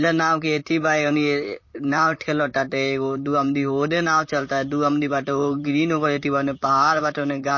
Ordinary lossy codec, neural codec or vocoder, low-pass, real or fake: MP3, 32 kbps; vocoder, 44.1 kHz, 128 mel bands, Pupu-Vocoder; 7.2 kHz; fake